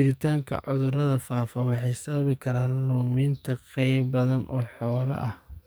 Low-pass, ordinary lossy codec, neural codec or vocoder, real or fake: none; none; codec, 44.1 kHz, 2.6 kbps, SNAC; fake